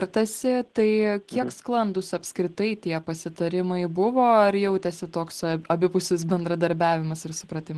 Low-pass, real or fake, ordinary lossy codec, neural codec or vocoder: 10.8 kHz; real; Opus, 16 kbps; none